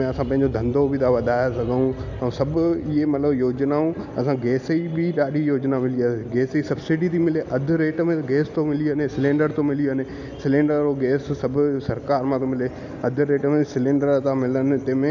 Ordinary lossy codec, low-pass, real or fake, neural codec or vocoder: none; 7.2 kHz; real; none